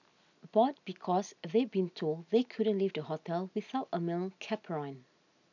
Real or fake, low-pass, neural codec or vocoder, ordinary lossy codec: real; 7.2 kHz; none; none